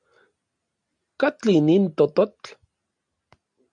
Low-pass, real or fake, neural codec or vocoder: 9.9 kHz; real; none